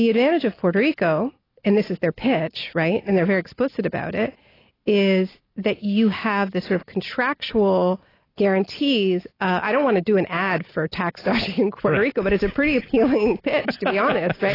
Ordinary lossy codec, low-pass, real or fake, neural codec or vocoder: AAC, 24 kbps; 5.4 kHz; real; none